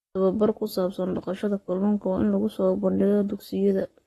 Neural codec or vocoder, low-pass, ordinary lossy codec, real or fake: codec, 44.1 kHz, 7.8 kbps, Pupu-Codec; 19.8 kHz; AAC, 32 kbps; fake